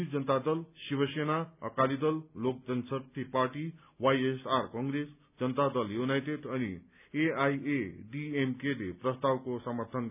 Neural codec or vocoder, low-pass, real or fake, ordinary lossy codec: none; 3.6 kHz; real; none